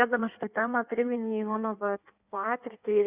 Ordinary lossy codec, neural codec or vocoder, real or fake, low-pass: Opus, 64 kbps; codec, 16 kHz in and 24 kHz out, 1.1 kbps, FireRedTTS-2 codec; fake; 3.6 kHz